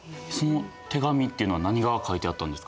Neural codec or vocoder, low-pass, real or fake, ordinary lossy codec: none; none; real; none